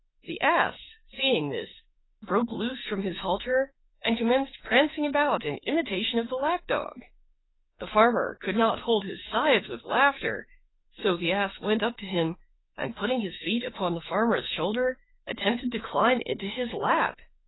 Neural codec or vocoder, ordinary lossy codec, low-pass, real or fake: autoencoder, 48 kHz, 32 numbers a frame, DAC-VAE, trained on Japanese speech; AAC, 16 kbps; 7.2 kHz; fake